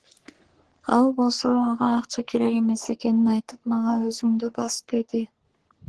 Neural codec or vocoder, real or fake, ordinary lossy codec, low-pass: codec, 44.1 kHz, 3.4 kbps, Pupu-Codec; fake; Opus, 16 kbps; 10.8 kHz